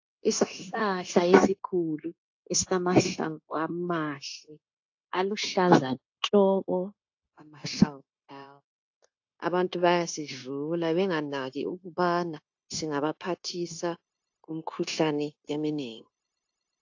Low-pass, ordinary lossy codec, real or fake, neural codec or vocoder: 7.2 kHz; AAC, 48 kbps; fake; codec, 16 kHz, 0.9 kbps, LongCat-Audio-Codec